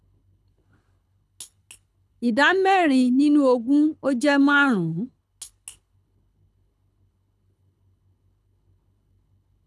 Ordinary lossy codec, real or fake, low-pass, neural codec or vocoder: none; fake; none; codec, 24 kHz, 6 kbps, HILCodec